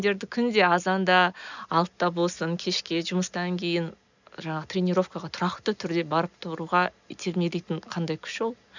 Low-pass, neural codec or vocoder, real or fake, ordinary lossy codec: 7.2 kHz; none; real; none